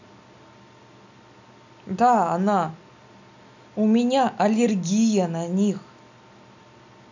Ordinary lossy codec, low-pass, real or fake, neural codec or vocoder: none; 7.2 kHz; real; none